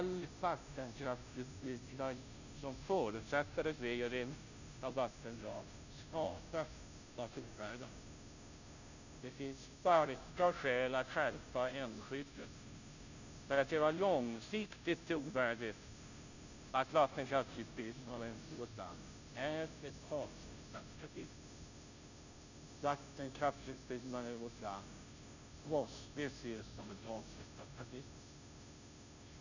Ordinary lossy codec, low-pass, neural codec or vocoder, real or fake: none; 7.2 kHz; codec, 16 kHz, 0.5 kbps, FunCodec, trained on Chinese and English, 25 frames a second; fake